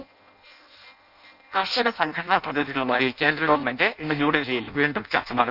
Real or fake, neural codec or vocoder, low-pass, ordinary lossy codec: fake; codec, 16 kHz in and 24 kHz out, 0.6 kbps, FireRedTTS-2 codec; 5.4 kHz; none